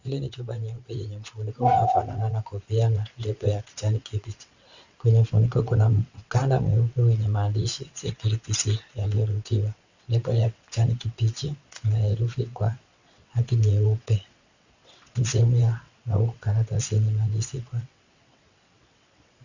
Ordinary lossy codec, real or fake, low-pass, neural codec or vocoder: Opus, 64 kbps; fake; 7.2 kHz; vocoder, 22.05 kHz, 80 mel bands, WaveNeXt